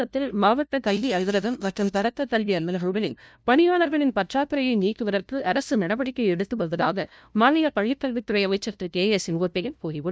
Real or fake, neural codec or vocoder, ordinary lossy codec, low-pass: fake; codec, 16 kHz, 0.5 kbps, FunCodec, trained on LibriTTS, 25 frames a second; none; none